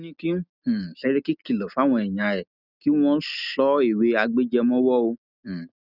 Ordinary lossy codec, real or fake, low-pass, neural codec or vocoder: none; real; 5.4 kHz; none